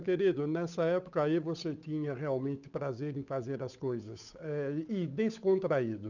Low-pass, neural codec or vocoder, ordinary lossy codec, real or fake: 7.2 kHz; codec, 16 kHz, 8 kbps, FunCodec, trained on Chinese and English, 25 frames a second; none; fake